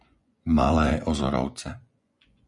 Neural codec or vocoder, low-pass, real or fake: vocoder, 24 kHz, 100 mel bands, Vocos; 10.8 kHz; fake